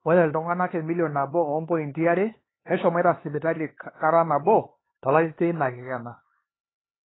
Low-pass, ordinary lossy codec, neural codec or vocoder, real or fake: 7.2 kHz; AAC, 16 kbps; codec, 16 kHz, 4 kbps, X-Codec, HuBERT features, trained on LibriSpeech; fake